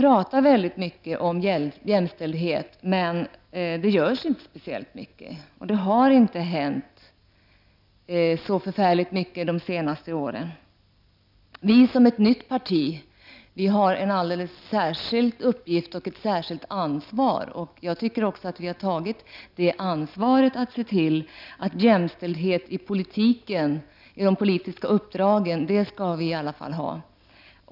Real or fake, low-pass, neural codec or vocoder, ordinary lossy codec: real; 5.4 kHz; none; none